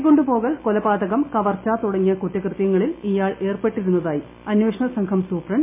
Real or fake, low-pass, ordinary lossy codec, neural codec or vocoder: real; 3.6 kHz; MP3, 24 kbps; none